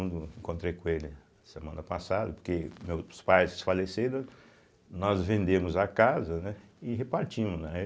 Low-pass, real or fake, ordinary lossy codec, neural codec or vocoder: none; real; none; none